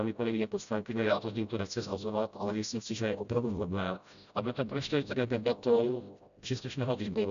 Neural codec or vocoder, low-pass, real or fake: codec, 16 kHz, 0.5 kbps, FreqCodec, smaller model; 7.2 kHz; fake